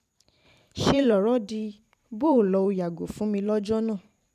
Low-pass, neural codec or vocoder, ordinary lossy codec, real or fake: 14.4 kHz; vocoder, 44.1 kHz, 128 mel bands every 512 samples, BigVGAN v2; none; fake